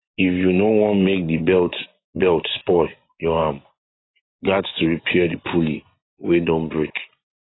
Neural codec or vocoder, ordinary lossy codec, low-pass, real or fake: vocoder, 24 kHz, 100 mel bands, Vocos; AAC, 16 kbps; 7.2 kHz; fake